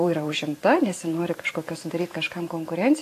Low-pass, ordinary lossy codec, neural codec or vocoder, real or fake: 14.4 kHz; AAC, 48 kbps; vocoder, 44.1 kHz, 128 mel bands every 512 samples, BigVGAN v2; fake